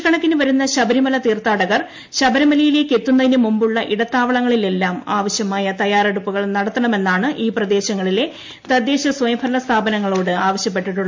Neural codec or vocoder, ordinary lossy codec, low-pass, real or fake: none; MP3, 48 kbps; 7.2 kHz; real